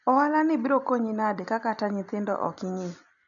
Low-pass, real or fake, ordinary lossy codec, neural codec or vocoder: 7.2 kHz; real; none; none